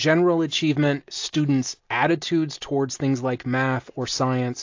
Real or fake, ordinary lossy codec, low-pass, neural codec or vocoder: real; AAC, 48 kbps; 7.2 kHz; none